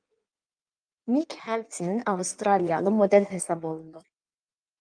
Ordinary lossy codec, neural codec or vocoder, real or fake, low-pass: Opus, 32 kbps; codec, 16 kHz in and 24 kHz out, 1.1 kbps, FireRedTTS-2 codec; fake; 9.9 kHz